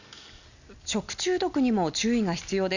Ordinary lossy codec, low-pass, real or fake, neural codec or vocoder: none; 7.2 kHz; real; none